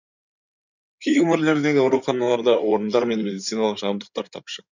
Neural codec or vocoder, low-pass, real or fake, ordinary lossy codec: codec, 16 kHz in and 24 kHz out, 2.2 kbps, FireRedTTS-2 codec; 7.2 kHz; fake; none